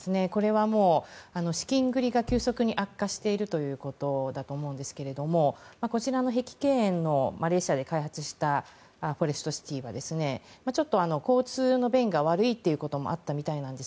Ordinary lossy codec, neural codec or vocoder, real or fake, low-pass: none; none; real; none